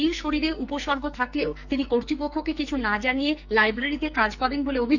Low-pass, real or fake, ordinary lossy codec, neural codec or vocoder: 7.2 kHz; fake; none; codec, 44.1 kHz, 2.6 kbps, SNAC